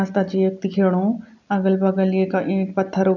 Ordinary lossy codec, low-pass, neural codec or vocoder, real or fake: none; 7.2 kHz; none; real